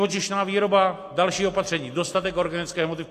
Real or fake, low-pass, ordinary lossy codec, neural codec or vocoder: real; 14.4 kHz; AAC, 48 kbps; none